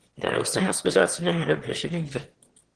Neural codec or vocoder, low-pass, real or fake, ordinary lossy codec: autoencoder, 22.05 kHz, a latent of 192 numbers a frame, VITS, trained on one speaker; 9.9 kHz; fake; Opus, 16 kbps